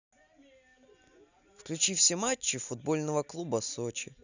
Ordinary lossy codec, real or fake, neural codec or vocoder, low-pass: none; real; none; 7.2 kHz